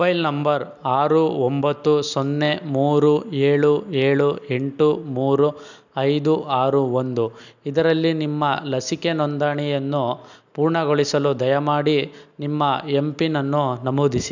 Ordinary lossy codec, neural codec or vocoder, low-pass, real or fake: none; none; 7.2 kHz; real